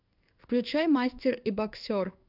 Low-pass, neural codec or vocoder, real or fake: 5.4 kHz; codec, 16 kHz in and 24 kHz out, 1 kbps, XY-Tokenizer; fake